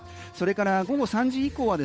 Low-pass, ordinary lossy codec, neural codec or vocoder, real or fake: none; none; codec, 16 kHz, 8 kbps, FunCodec, trained on Chinese and English, 25 frames a second; fake